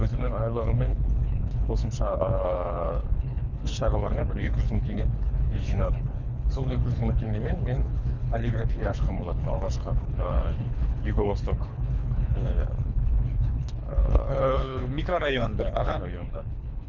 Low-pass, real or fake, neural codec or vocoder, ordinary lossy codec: 7.2 kHz; fake; codec, 24 kHz, 3 kbps, HILCodec; none